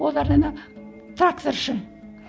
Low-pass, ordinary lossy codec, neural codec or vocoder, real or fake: none; none; none; real